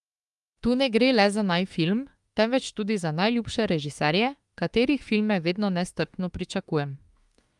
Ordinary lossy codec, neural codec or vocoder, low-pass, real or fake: Opus, 32 kbps; autoencoder, 48 kHz, 32 numbers a frame, DAC-VAE, trained on Japanese speech; 10.8 kHz; fake